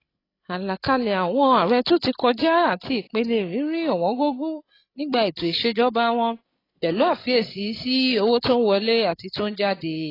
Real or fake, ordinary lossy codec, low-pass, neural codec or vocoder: fake; AAC, 24 kbps; 5.4 kHz; codec, 16 kHz, 16 kbps, FreqCodec, larger model